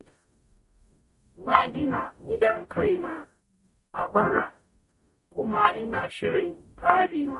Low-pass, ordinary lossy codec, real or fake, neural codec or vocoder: 14.4 kHz; MP3, 48 kbps; fake; codec, 44.1 kHz, 0.9 kbps, DAC